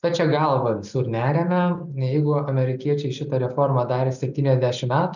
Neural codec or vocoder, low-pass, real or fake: none; 7.2 kHz; real